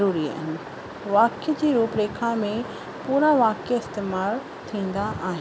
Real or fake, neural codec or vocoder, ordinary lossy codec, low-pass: real; none; none; none